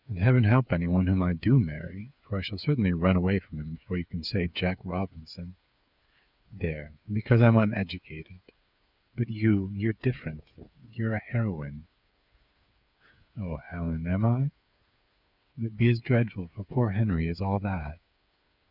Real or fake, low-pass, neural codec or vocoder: fake; 5.4 kHz; codec, 16 kHz, 8 kbps, FreqCodec, smaller model